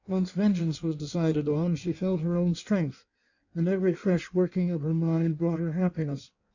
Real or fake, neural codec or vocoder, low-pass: fake; codec, 16 kHz in and 24 kHz out, 1.1 kbps, FireRedTTS-2 codec; 7.2 kHz